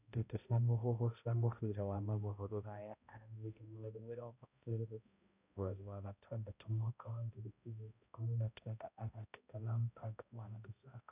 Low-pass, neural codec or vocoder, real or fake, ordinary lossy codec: 3.6 kHz; codec, 16 kHz, 0.5 kbps, X-Codec, HuBERT features, trained on balanced general audio; fake; none